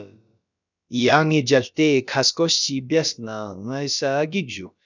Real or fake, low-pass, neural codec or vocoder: fake; 7.2 kHz; codec, 16 kHz, about 1 kbps, DyCAST, with the encoder's durations